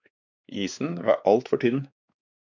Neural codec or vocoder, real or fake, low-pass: codec, 16 kHz, 2 kbps, X-Codec, WavLM features, trained on Multilingual LibriSpeech; fake; 7.2 kHz